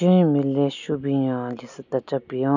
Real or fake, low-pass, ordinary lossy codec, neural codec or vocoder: real; 7.2 kHz; none; none